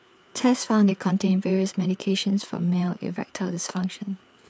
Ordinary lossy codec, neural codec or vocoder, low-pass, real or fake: none; codec, 16 kHz, 4 kbps, FreqCodec, larger model; none; fake